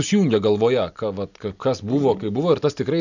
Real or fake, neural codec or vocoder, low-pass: real; none; 7.2 kHz